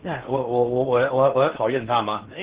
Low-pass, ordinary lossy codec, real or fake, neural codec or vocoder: 3.6 kHz; Opus, 16 kbps; fake; codec, 16 kHz in and 24 kHz out, 0.8 kbps, FocalCodec, streaming, 65536 codes